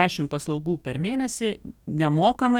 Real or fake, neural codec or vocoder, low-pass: fake; codec, 44.1 kHz, 2.6 kbps, DAC; 19.8 kHz